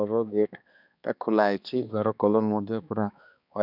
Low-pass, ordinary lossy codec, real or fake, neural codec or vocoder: 5.4 kHz; none; fake; codec, 16 kHz, 2 kbps, X-Codec, HuBERT features, trained on balanced general audio